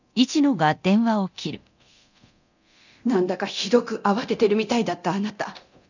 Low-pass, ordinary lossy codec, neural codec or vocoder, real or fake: 7.2 kHz; none; codec, 24 kHz, 0.9 kbps, DualCodec; fake